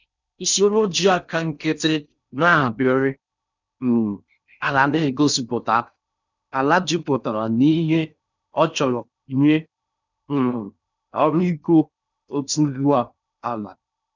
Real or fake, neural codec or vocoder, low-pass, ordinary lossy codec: fake; codec, 16 kHz in and 24 kHz out, 0.6 kbps, FocalCodec, streaming, 4096 codes; 7.2 kHz; none